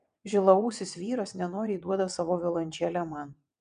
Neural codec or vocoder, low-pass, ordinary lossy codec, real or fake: vocoder, 22.05 kHz, 80 mel bands, WaveNeXt; 9.9 kHz; MP3, 96 kbps; fake